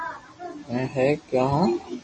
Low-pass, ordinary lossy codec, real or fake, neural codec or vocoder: 9.9 kHz; MP3, 32 kbps; real; none